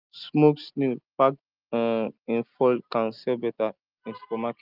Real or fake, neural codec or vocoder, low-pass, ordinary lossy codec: real; none; 5.4 kHz; Opus, 24 kbps